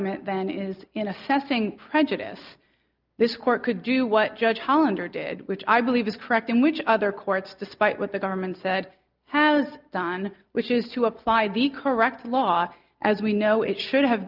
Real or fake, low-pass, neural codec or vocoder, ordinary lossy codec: real; 5.4 kHz; none; Opus, 24 kbps